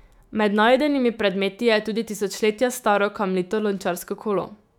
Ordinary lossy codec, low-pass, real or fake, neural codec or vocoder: none; 19.8 kHz; fake; autoencoder, 48 kHz, 128 numbers a frame, DAC-VAE, trained on Japanese speech